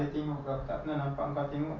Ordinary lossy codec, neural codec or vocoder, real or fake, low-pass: none; none; real; 7.2 kHz